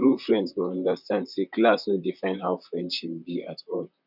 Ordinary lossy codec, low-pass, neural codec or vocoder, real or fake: none; 5.4 kHz; vocoder, 44.1 kHz, 128 mel bands, Pupu-Vocoder; fake